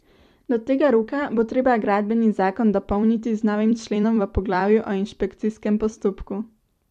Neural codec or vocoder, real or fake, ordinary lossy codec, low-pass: vocoder, 44.1 kHz, 128 mel bands every 256 samples, BigVGAN v2; fake; MP3, 64 kbps; 14.4 kHz